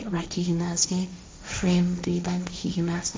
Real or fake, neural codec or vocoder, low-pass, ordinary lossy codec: fake; codec, 16 kHz, 1.1 kbps, Voila-Tokenizer; none; none